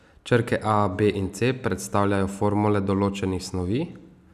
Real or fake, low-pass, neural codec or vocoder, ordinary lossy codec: real; 14.4 kHz; none; none